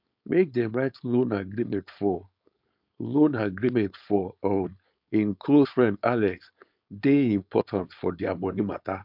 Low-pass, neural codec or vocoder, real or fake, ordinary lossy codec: 5.4 kHz; codec, 16 kHz, 4.8 kbps, FACodec; fake; MP3, 48 kbps